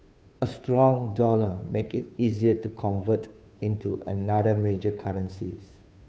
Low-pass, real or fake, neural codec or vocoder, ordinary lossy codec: none; fake; codec, 16 kHz, 2 kbps, FunCodec, trained on Chinese and English, 25 frames a second; none